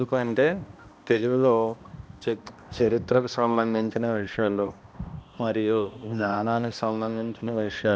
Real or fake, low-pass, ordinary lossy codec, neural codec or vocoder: fake; none; none; codec, 16 kHz, 1 kbps, X-Codec, HuBERT features, trained on balanced general audio